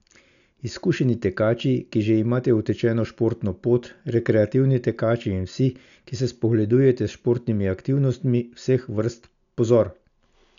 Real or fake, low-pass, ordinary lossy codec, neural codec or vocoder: real; 7.2 kHz; none; none